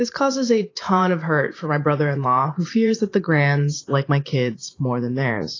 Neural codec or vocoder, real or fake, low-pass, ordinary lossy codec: vocoder, 44.1 kHz, 128 mel bands every 512 samples, BigVGAN v2; fake; 7.2 kHz; AAC, 32 kbps